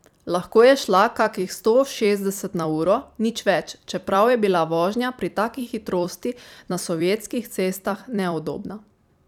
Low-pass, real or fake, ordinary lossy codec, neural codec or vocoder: 19.8 kHz; fake; none; vocoder, 44.1 kHz, 128 mel bands every 256 samples, BigVGAN v2